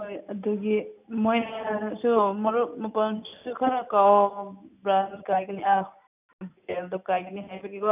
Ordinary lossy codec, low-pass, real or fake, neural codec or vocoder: none; 3.6 kHz; real; none